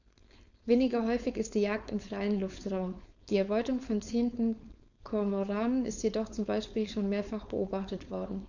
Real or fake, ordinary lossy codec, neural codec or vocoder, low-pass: fake; none; codec, 16 kHz, 4.8 kbps, FACodec; 7.2 kHz